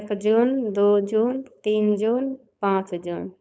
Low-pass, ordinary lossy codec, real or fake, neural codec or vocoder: none; none; fake; codec, 16 kHz, 4.8 kbps, FACodec